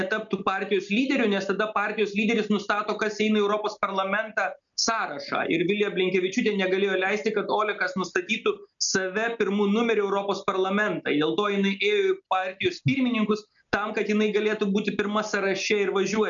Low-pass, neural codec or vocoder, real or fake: 7.2 kHz; none; real